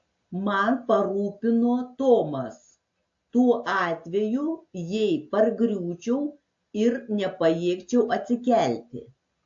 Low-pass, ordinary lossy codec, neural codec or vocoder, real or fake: 7.2 kHz; AAC, 48 kbps; none; real